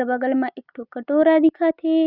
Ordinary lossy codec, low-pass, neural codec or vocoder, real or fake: none; 5.4 kHz; none; real